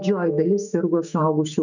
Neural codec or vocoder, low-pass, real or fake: autoencoder, 48 kHz, 128 numbers a frame, DAC-VAE, trained on Japanese speech; 7.2 kHz; fake